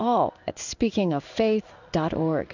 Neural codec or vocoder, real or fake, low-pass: codec, 16 kHz in and 24 kHz out, 1 kbps, XY-Tokenizer; fake; 7.2 kHz